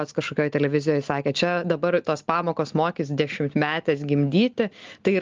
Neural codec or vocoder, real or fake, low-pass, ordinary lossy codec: none; real; 7.2 kHz; Opus, 32 kbps